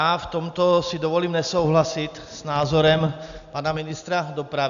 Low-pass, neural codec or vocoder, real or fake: 7.2 kHz; none; real